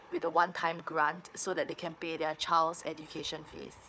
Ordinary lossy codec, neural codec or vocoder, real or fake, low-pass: none; codec, 16 kHz, 4 kbps, FunCodec, trained on Chinese and English, 50 frames a second; fake; none